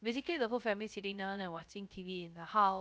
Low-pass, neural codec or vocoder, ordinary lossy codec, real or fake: none; codec, 16 kHz, 0.3 kbps, FocalCodec; none; fake